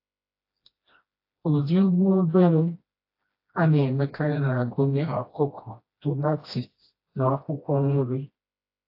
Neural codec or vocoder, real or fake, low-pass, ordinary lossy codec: codec, 16 kHz, 1 kbps, FreqCodec, smaller model; fake; 5.4 kHz; AAC, 32 kbps